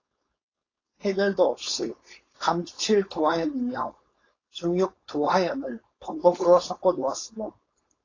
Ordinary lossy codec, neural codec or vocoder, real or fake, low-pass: AAC, 32 kbps; codec, 16 kHz, 4.8 kbps, FACodec; fake; 7.2 kHz